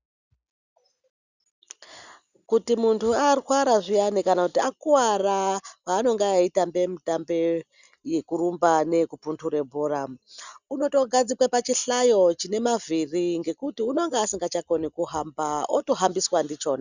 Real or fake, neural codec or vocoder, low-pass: real; none; 7.2 kHz